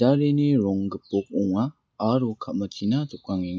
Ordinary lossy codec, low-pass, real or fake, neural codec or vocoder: none; none; real; none